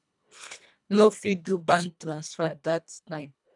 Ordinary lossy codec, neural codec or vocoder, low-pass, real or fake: none; codec, 24 kHz, 1.5 kbps, HILCodec; 10.8 kHz; fake